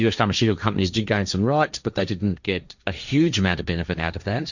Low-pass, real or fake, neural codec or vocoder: 7.2 kHz; fake; codec, 16 kHz, 1.1 kbps, Voila-Tokenizer